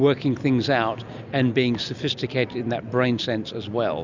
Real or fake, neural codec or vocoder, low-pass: real; none; 7.2 kHz